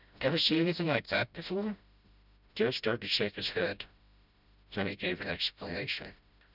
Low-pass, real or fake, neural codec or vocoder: 5.4 kHz; fake; codec, 16 kHz, 0.5 kbps, FreqCodec, smaller model